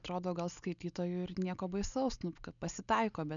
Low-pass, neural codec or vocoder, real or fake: 7.2 kHz; none; real